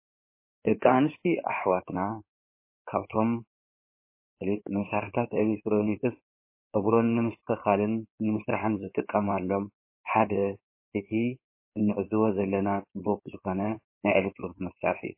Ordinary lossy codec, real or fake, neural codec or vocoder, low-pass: MP3, 24 kbps; fake; codec, 16 kHz in and 24 kHz out, 2.2 kbps, FireRedTTS-2 codec; 3.6 kHz